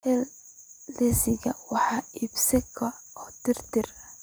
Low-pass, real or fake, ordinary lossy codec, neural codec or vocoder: none; real; none; none